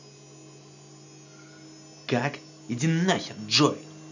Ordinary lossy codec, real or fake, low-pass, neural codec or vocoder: none; real; 7.2 kHz; none